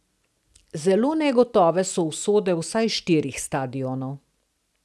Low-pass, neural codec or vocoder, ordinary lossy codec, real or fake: none; none; none; real